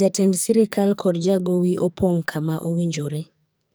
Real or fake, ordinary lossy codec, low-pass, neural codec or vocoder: fake; none; none; codec, 44.1 kHz, 2.6 kbps, SNAC